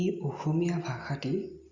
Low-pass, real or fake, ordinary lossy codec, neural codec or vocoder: 7.2 kHz; real; Opus, 64 kbps; none